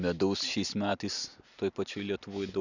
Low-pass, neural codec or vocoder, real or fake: 7.2 kHz; none; real